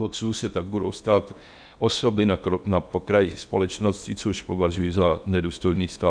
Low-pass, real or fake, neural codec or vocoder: 9.9 kHz; fake; codec, 16 kHz in and 24 kHz out, 0.8 kbps, FocalCodec, streaming, 65536 codes